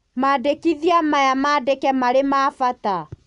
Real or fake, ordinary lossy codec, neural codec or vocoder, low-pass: real; none; none; 10.8 kHz